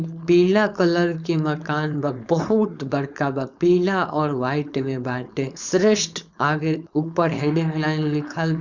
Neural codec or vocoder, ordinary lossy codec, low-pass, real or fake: codec, 16 kHz, 4.8 kbps, FACodec; none; 7.2 kHz; fake